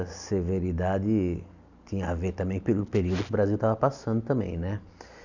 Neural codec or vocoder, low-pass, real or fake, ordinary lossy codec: none; 7.2 kHz; real; none